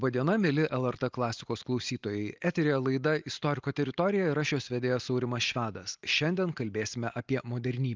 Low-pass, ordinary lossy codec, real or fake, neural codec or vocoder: 7.2 kHz; Opus, 32 kbps; real; none